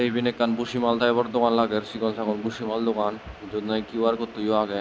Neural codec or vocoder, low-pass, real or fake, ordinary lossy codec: none; none; real; none